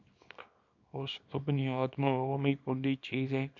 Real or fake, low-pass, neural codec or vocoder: fake; 7.2 kHz; codec, 24 kHz, 0.9 kbps, WavTokenizer, small release